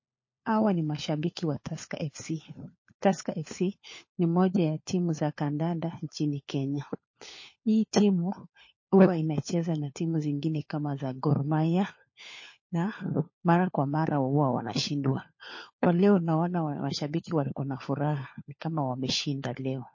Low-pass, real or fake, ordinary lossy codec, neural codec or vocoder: 7.2 kHz; fake; MP3, 32 kbps; codec, 16 kHz, 4 kbps, FunCodec, trained on LibriTTS, 50 frames a second